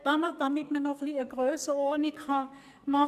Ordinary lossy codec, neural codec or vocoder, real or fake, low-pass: none; codec, 44.1 kHz, 2.6 kbps, SNAC; fake; 14.4 kHz